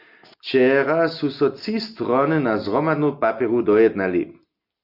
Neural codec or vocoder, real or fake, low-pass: none; real; 5.4 kHz